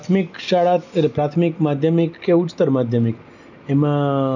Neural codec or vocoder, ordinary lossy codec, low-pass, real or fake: none; none; 7.2 kHz; real